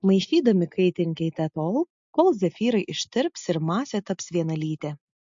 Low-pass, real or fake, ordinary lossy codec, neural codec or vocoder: 7.2 kHz; real; MP3, 48 kbps; none